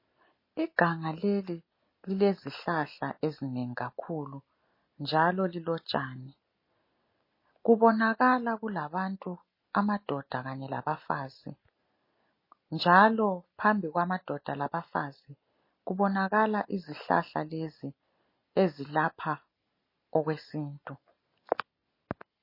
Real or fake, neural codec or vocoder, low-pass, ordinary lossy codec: real; none; 5.4 kHz; MP3, 24 kbps